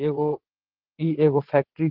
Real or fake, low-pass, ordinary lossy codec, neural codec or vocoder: fake; 5.4 kHz; Opus, 16 kbps; vocoder, 22.05 kHz, 80 mel bands, WaveNeXt